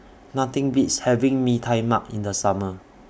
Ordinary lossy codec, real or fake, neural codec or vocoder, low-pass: none; real; none; none